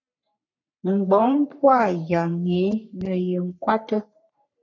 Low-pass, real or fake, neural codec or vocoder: 7.2 kHz; fake; codec, 44.1 kHz, 3.4 kbps, Pupu-Codec